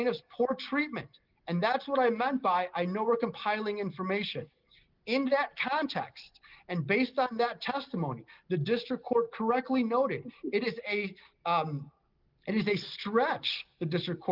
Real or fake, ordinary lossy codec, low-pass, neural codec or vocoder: real; Opus, 24 kbps; 5.4 kHz; none